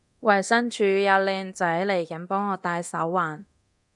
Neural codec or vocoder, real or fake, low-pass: codec, 24 kHz, 0.9 kbps, DualCodec; fake; 10.8 kHz